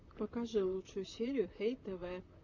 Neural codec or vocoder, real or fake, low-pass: vocoder, 44.1 kHz, 128 mel bands, Pupu-Vocoder; fake; 7.2 kHz